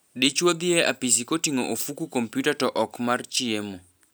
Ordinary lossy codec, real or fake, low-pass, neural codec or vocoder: none; real; none; none